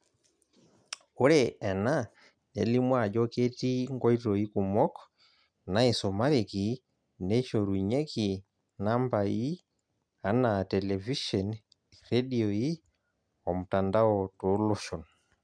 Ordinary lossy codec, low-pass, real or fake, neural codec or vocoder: none; 9.9 kHz; real; none